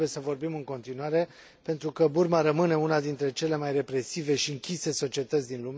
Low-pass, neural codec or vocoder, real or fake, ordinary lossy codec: none; none; real; none